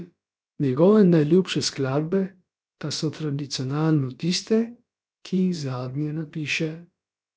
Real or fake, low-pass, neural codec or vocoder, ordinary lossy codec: fake; none; codec, 16 kHz, about 1 kbps, DyCAST, with the encoder's durations; none